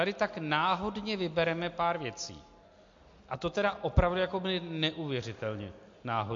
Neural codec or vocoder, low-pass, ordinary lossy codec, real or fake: none; 7.2 kHz; MP3, 48 kbps; real